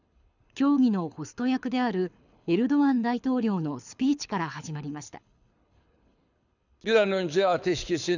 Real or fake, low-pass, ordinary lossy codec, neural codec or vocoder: fake; 7.2 kHz; none; codec, 24 kHz, 6 kbps, HILCodec